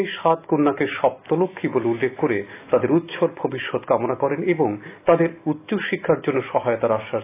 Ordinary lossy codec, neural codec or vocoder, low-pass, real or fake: AAC, 24 kbps; none; 3.6 kHz; real